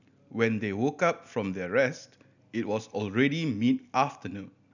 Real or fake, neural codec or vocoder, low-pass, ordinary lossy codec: real; none; 7.2 kHz; none